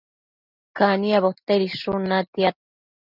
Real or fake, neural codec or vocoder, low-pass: real; none; 5.4 kHz